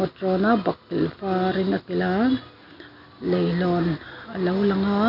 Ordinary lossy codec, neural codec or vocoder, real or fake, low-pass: none; none; real; 5.4 kHz